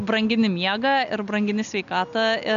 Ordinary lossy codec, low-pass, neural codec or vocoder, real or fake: MP3, 64 kbps; 7.2 kHz; none; real